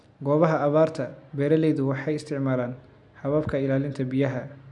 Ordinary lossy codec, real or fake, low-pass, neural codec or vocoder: MP3, 96 kbps; real; 10.8 kHz; none